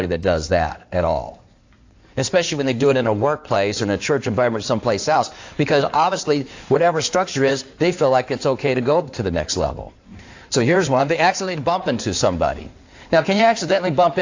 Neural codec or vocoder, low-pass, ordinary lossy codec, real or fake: codec, 16 kHz in and 24 kHz out, 2.2 kbps, FireRedTTS-2 codec; 7.2 kHz; AAC, 48 kbps; fake